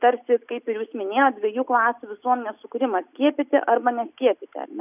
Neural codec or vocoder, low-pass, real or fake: none; 3.6 kHz; real